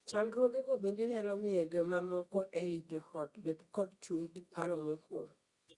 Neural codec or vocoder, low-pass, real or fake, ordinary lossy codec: codec, 24 kHz, 0.9 kbps, WavTokenizer, medium music audio release; 10.8 kHz; fake; Opus, 64 kbps